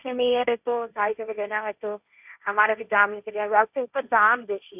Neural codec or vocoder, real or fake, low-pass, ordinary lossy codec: codec, 16 kHz, 1.1 kbps, Voila-Tokenizer; fake; 3.6 kHz; none